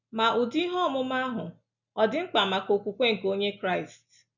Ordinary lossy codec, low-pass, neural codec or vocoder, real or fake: none; 7.2 kHz; none; real